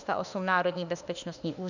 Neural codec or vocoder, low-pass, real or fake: autoencoder, 48 kHz, 32 numbers a frame, DAC-VAE, trained on Japanese speech; 7.2 kHz; fake